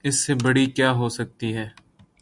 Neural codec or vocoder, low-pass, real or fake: none; 10.8 kHz; real